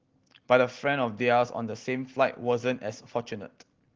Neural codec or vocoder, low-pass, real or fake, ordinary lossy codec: none; 7.2 kHz; real; Opus, 16 kbps